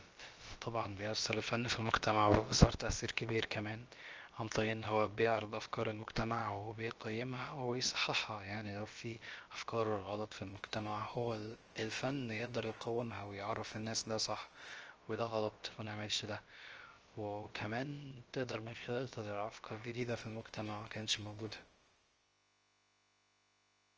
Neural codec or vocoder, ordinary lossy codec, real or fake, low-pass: codec, 16 kHz, about 1 kbps, DyCAST, with the encoder's durations; Opus, 24 kbps; fake; 7.2 kHz